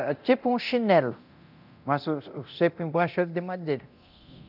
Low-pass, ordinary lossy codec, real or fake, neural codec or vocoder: 5.4 kHz; none; fake; codec, 24 kHz, 0.9 kbps, DualCodec